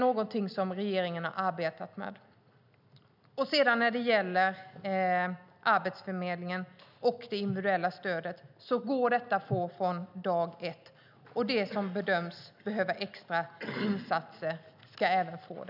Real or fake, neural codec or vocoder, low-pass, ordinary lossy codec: real; none; 5.4 kHz; none